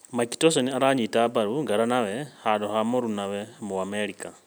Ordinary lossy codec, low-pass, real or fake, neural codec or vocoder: none; none; real; none